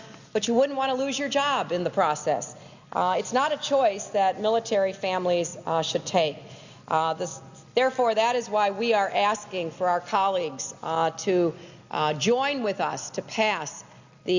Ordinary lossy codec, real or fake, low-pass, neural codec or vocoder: Opus, 64 kbps; real; 7.2 kHz; none